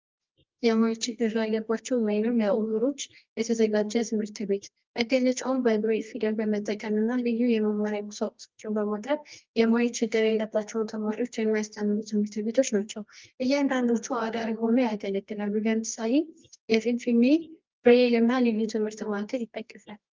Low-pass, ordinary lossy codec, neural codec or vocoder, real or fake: 7.2 kHz; Opus, 32 kbps; codec, 24 kHz, 0.9 kbps, WavTokenizer, medium music audio release; fake